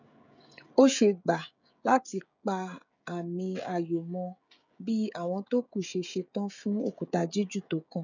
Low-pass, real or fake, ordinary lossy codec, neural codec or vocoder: 7.2 kHz; fake; none; codec, 16 kHz, 16 kbps, FreqCodec, smaller model